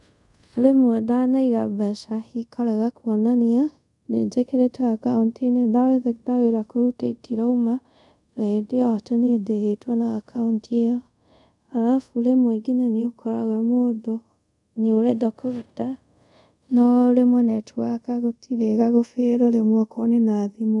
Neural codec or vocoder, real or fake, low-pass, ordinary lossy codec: codec, 24 kHz, 0.5 kbps, DualCodec; fake; 10.8 kHz; none